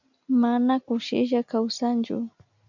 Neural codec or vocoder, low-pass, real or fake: none; 7.2 kHz; real